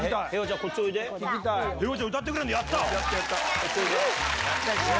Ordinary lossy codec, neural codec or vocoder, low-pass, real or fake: none; none; none; real